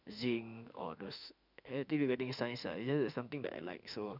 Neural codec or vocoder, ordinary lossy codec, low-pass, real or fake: autoencoder, 48 kHz, 32 numbers a frame, DAC-VAE, trained on Japanese speech; none; 5.4 kHz; fake